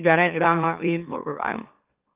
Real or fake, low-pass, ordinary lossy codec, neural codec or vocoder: fake; 3.6 kHz; Opus, 24 kbps; autoencoder, 44.1 kHz, a latent of 192 numbers a frame, MeloTTS